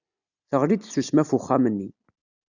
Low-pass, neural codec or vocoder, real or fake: 7.2 kHz; none; real